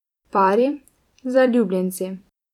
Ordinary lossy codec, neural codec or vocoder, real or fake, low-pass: none; vocoder, 44.1 kHz, 128 mel bands every 256 samples, BigVGAN v2; fake; 19.8 kHz